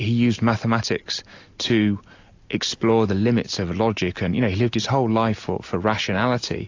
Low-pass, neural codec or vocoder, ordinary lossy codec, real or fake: 7.2 kHz; none; AAC, 48 kbps; real